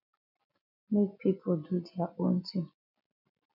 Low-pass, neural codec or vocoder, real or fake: 5.4 kHz; none; real